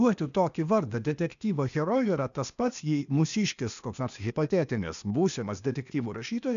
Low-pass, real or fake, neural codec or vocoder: 7.2 kHz; fake; codec, 16 kHz, 0.8 kbps, ZipCodec